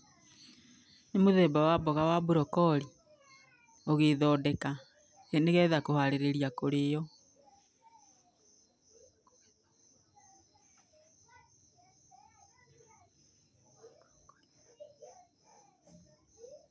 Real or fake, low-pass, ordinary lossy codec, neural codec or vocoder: real; none; none; none